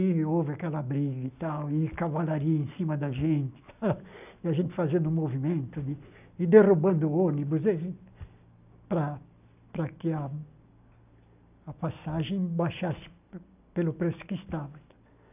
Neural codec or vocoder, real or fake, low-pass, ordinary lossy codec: none; real; 3.6 kHz; none